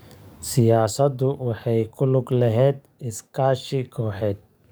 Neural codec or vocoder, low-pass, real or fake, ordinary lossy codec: codec, 44.1 kHz, 7.8 kbps, DAC; none; fake; none